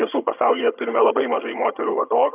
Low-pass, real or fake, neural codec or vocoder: 3.6 kHz; fake; vocoder, 22.05 kHz, 80 mel bands, HiFi-GAN